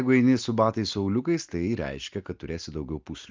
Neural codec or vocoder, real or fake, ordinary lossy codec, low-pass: none; real; Opus, 24 kbps; 7.2 kHz